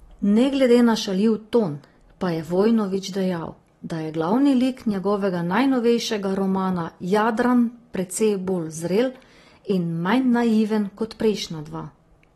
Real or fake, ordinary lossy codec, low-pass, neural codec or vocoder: real; AAC, 32 kbps; 19.8 kHz; none